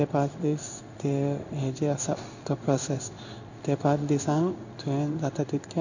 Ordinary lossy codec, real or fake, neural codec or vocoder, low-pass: none; fake; codec, 16 kHz in and 24 kHz out, 1 kbps, XY-Tokenizer; 7.2 kHz